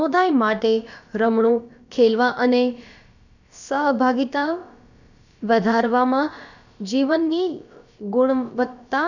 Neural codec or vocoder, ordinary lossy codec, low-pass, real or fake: codec, 16 kHz, about 1 kbps, DyCAST, with the encoder's durations; none; 7.2 kHz; fake